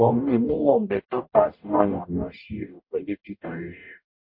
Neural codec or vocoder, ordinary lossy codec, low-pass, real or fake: codec, 44.1 kHz, 0.9 kbps, DAC; MP3, 32 kbps; 5.4 kHz; fake